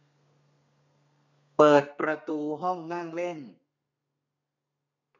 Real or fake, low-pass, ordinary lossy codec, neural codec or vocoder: fake; 7.2 kHz; none; codec, 32 kHz, 1.9 kbps, SNAC